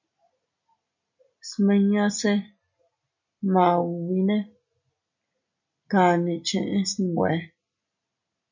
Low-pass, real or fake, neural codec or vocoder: 7.2 kHz; real; none